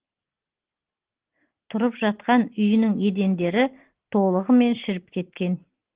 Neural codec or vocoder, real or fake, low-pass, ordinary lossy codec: none; real; 3.6 kHz; Opus, 16 kbps